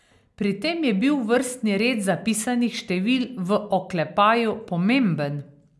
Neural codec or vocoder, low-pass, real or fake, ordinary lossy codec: none; none; real; none